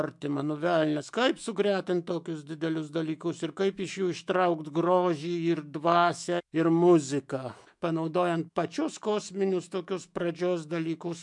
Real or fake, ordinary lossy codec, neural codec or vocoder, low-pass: fake; MP3, 64 kbps; autoencoder, 48 kHz, 128 numbers a frame, DAC-VAE, trained on Japanese speech; 10.8 kHz